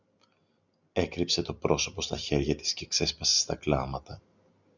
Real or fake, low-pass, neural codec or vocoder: real; 7.2 kHz; none